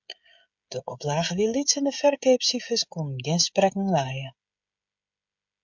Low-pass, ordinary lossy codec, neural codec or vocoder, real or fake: 7.2 kHz; MP3, 64 kbps; codec, 16 kHz, 16 kbps, FreqCodec, smaller model; fake